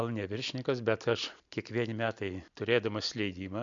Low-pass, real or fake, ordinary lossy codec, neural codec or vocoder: 7.2 kHz; real; MP3, 64 kbps; none